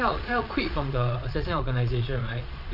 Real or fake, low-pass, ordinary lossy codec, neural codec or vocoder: fake; 5.4 kHz; none; vocoder, 22.05 kHz, 80 mel bands, Vocos